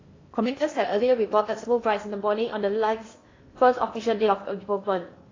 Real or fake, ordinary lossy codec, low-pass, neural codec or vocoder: fake; AAC, 32 kbps; 7.2 kHz; codec, 16 kHz in and 24 kHz out, 0.8 kbps, FocalCodec, streaming, 65536 codes